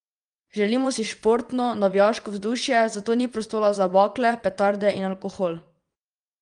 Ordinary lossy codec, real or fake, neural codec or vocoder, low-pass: Opus, 32 kbps; fake; vocoder, 22.05 kHz, 80 mel bands, WaveNeXt; 9.9 kHz